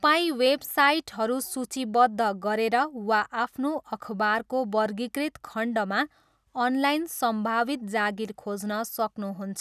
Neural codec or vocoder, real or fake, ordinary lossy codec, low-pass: none; real; none; 14.4 kHz